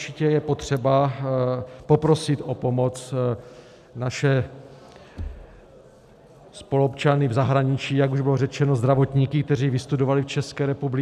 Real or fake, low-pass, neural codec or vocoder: real; 14.4 kHz; none